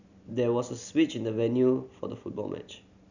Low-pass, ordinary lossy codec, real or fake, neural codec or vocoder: 7.2 kHz; none; real; none